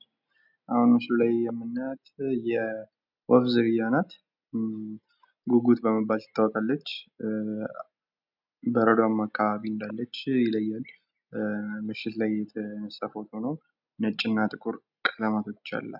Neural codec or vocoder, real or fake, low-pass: none; real; 5.4 kHz